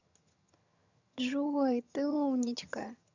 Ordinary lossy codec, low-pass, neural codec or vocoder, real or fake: none; 7.2 kHz; vocoder, 22.05 kHz, 80 mel bands, HiFi-GAN; fake